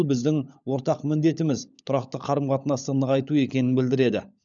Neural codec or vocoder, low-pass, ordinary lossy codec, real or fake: codec, 16 kHz, 16 kbps, FunCodec, trained on LibriTTS, 50 frames a second; 7.2 kHz; none; fake